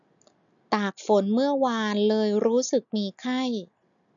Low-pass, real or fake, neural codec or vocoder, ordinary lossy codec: 7.2 kHz; real; none; none